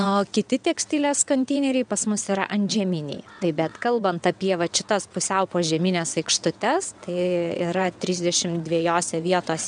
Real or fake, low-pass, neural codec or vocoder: fake; 9.9 kHz; vocoder, 22.05 kHz, 80 mel bands, WaveNeXt